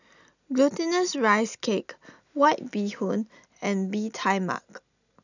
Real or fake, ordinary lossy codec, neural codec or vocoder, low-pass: real; none; none; 7.2 kHz